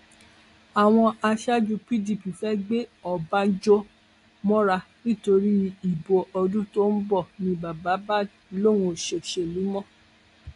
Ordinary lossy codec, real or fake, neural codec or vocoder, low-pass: AAC, 48 kbps; real; none; 10.8 kHz